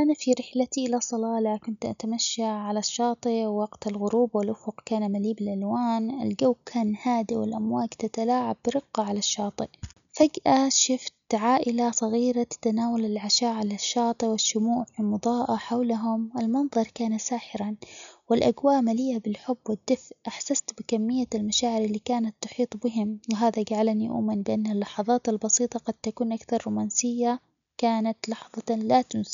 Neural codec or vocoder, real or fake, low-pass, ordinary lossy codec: none; real; 7.2 kHz; none